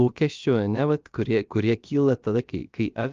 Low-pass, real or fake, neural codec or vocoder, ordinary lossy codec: 7.2 kHz; fake; codec, 16 kHz, about 1 kbps, DyCAST, with the encoder's durations; Opus, 32 kbps